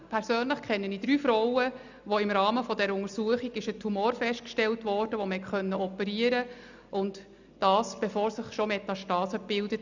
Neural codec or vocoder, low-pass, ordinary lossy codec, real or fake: none; 7.2 kHz; none; real